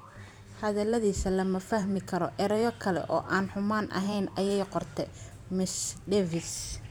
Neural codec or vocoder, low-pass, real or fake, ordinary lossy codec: vocoder, 44.1 kHz, 128 mel bands every 512 samples, BigVGAN v2; none; fake; none